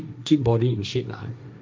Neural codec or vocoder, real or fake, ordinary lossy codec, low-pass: codec, 16 kHz, 1.1 kbps, Voila-Tokenizer; fake; none; none